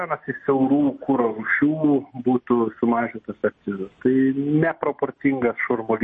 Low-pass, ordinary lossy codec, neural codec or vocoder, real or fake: 10.8 kHz; MP3, 32 kbps; none; real